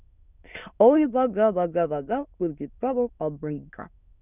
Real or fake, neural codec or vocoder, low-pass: fake; autoencoder, 22.05 kHz, a latent of 192 numbers a frame, VITS, trained on many speakers; 3.6 kHz